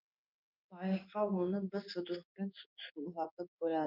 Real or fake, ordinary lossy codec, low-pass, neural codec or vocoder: fake; none; 5.4 kHz; codec, 16 kHz in and 24 kHz out, 1 kbps, XY-Tokenizer